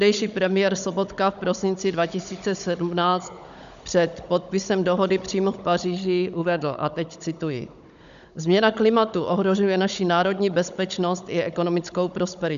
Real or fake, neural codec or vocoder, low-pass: fake; codec, 16 kHz, 16 kbps, FunCodec, trained on Chinese and English, 50 frames a second; 7.2 kHz